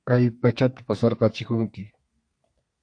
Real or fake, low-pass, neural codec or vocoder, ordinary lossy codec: fake; 9.9 kHz; codec, 32 kHz, 1.9 kbps, SNAC; AAC, 48 kbps